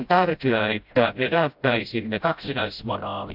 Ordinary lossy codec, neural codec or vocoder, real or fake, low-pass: AAC, 48 kbps; codec, 16 kHz, 0.5 kbps, FreqCodec, smaller model; fake; 5.4 kHz